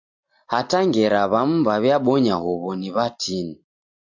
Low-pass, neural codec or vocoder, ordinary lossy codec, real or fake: 7.2 kHz; none; MP3, 64 kbps; real